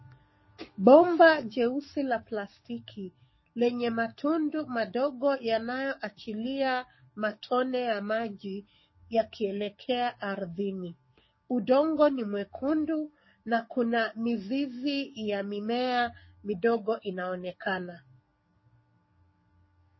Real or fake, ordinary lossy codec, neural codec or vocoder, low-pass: fake; MP3, 24 kbps; codec, 44.1 kHz, 7.8 kbps, DAC; 7.2 kHz